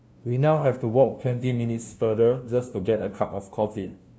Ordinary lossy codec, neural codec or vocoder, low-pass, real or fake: none; codec, 16 kHz, 0.5 kbps, FunCodec, trained on LibriTTS, 25 frames a second; none; fake